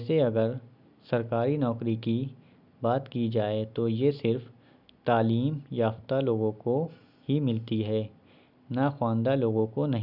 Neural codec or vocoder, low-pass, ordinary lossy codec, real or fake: none; 5.4 kHz; none; real